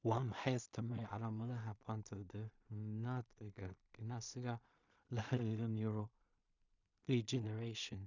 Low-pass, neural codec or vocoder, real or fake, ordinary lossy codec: 7.2 kHz; codec, 16 kHz in and 24 kHz out, 0.4 kbps, LongCat-Audio-Codec, two codebook decoder; fake; none